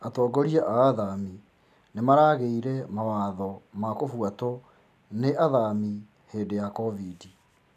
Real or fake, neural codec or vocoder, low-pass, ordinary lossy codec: real; none; 14.4 kHz; none